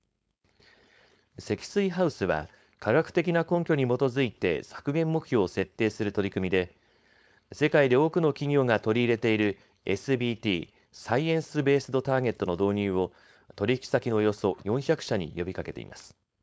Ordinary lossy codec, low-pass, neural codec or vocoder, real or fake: none; none; codec, 16 kHz, 4.8 kbps, FACodec; fake